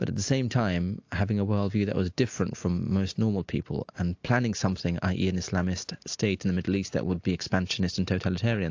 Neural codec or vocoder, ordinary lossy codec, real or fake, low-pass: none; AAC, 48 kbps; real; 7.2 kHz